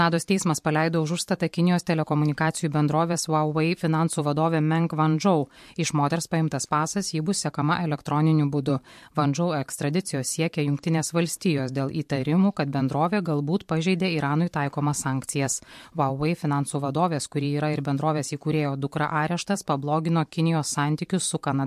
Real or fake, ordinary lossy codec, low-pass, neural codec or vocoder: fake; MP3, 64 kbps; 14.4 kHz; vocoder, 44.1 kHz, 128 mel bands every 512 samples, BigVGAN v2